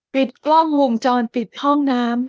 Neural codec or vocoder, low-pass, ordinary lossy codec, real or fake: codec, 16 kHz, 0.8 kbps, ZipCodec; none; none; fake